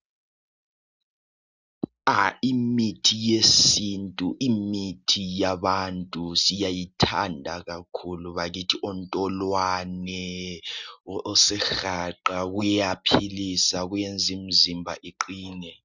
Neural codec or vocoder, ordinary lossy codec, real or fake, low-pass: none; Opus, 64 kbps; real; 7.2 kHz